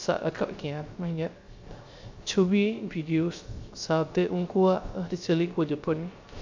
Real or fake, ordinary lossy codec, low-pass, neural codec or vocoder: fake; MP3, 64 kbps; 7.2 kHz; codec, 16 kHz, 0.3 kbps, FocalCodec